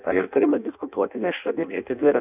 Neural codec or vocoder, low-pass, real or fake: codec, 16 kHz in and 24 kHz out, 0.6 kbps, FireRedTTS-2 codec; 3.6 kHz; fake